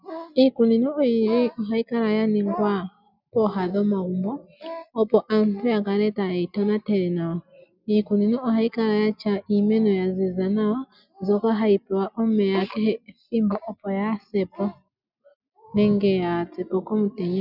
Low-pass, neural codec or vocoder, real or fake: 5.4 kHz; none; real